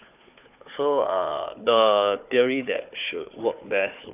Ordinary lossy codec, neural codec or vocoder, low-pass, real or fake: none; codec, 16 kHz, 4 kbps, FunCodec, trained on Chinese and English, 50 frames a second; 3.6 kHz; fake